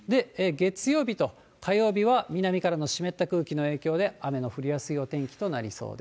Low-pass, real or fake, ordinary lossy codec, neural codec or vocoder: none; real; none; none